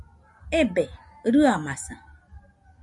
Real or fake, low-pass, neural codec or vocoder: real; 10.8 kHz; none